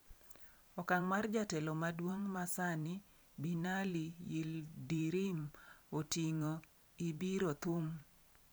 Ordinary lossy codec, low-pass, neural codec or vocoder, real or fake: none; none; vocoder, 44.1 kHz, 128 mel bands every 512 samples, BigVGAN v2; fake